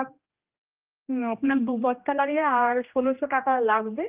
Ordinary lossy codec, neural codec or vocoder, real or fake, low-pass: Opus, 32 kbps; codec, 16 kHz, 1 kbps, X-Codec, HuBERT features, trained on general audio; fake; 3.6 kHz